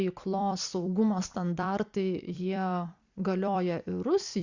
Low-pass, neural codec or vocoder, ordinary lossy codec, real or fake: 7.2 kHz; vocoder, 44.1 kHz, 128 mel bands every 256 samples, BigVGAN v2; Opus, 64 kbps; fake